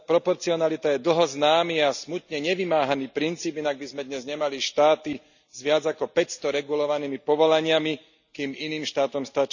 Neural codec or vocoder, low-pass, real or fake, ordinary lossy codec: none; 7.2 kHz; real; none